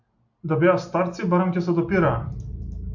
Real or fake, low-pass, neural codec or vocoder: real; 7.2 kHz; none